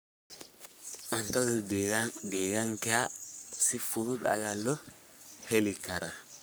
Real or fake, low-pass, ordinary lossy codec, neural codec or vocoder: fake; none; none; codec, 44.1 kHz, 3.4 kbps, Pupu-Codec